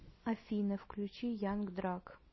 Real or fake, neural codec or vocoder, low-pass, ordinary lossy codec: real; none; 7.2 kHz; MP3, 24 kbps